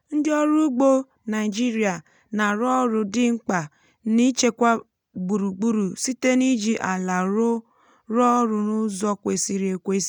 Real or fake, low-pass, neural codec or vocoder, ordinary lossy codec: real; 19.8 kHz; none; none